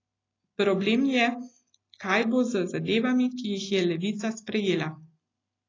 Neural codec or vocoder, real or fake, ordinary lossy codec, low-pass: none; real; AAC, 32 kbps; 7.2 kHz